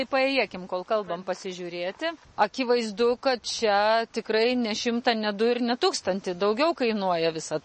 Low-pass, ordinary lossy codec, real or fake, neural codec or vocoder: 10.8 kHz; MP3, 32 kbps; real; none